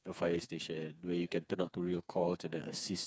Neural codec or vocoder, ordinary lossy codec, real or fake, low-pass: codec, 16 kHz, 4 kbps, FreqCodec, smaller model; none; fake; none